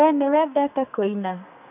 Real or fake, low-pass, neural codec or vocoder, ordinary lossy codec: fake; 3.6 kHz; codec, 44.1 kHz, 2.6 kbps, SNAC; none